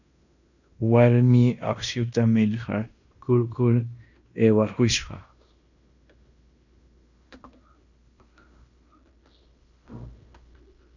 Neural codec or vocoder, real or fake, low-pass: codec, 16 kHz in and 24 kHz out, 0.9 kbps, LongCat-Audio-Codec, fine tuned four codebook decoder; fake; 7.2 kHz